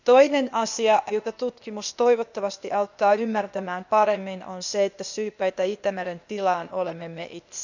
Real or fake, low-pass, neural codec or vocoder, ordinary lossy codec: fake; 7.2 kHz; codec, 16 kHz, 0.8 kbps, ZipCodec; none